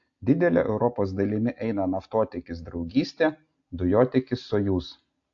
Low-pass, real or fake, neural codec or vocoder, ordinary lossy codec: 7.2 kHz; real; none; AAC, 48 kbps